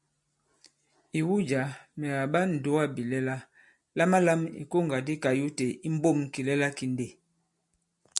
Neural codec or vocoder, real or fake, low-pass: none; real; 10.8 kHz